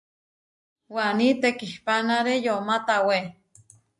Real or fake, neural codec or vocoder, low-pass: real; none; 10.8 kHz